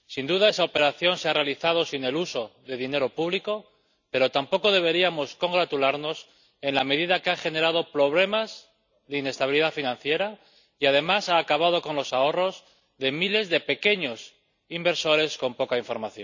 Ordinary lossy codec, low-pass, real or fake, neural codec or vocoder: none; 7.2 kHz; real; none